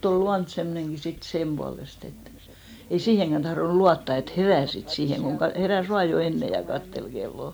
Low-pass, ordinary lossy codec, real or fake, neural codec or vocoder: none; none; real; none